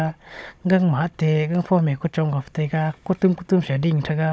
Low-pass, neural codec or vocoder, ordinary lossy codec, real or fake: none; codec, 16 kHz, 16 kbps, FunCodec, trained on Chinese and English, 50 frames a second; none; fake